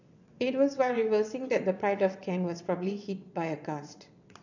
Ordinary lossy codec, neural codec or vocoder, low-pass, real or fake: none; vocoder, 22.05 kHz, 80 mel bands, WaveNeXt; 7.2 kHz; fake